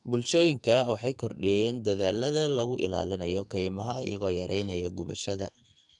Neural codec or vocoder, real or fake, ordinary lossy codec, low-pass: codec, 44.1 kHz, 2.6 kbps, SNAC; fake; none; 10.8 kHz